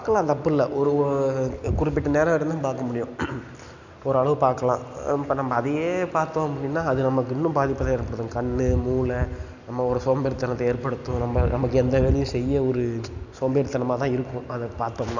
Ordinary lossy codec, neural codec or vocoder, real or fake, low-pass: none; none; real; 7.2 kHz